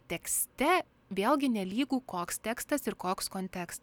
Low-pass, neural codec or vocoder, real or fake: 19.8 kHz; none; real